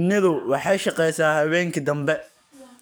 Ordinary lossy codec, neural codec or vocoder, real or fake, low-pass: none; codec, 44.1 kHz, 7.8 kbps, DAC; fake; none